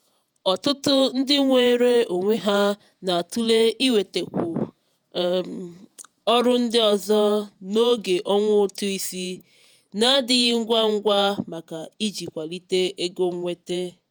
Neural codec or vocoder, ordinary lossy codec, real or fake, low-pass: vocoder, 48 kHz, 128 mel bands, Vocos; none; fake; none